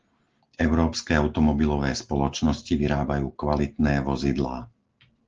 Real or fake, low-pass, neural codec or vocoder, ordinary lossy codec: real; 7.2 kHz; none; Opus, 16 kbps